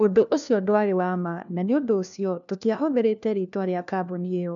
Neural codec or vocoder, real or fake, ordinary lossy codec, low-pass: codec, 16 kHz, 1 kbps, FunCodec, trained on LibriTTS, 50 frames a second; fake; none; 7.2 kHz